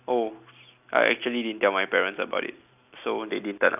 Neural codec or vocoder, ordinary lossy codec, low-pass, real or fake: none; none; 3.6 kHz; real